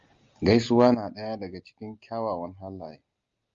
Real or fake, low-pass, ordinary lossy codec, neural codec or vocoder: real; 7.2 kHz; Opus, 32 kbps; none